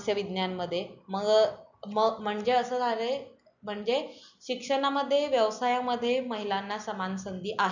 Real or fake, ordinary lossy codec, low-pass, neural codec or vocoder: real; none; 7.2 kHz; none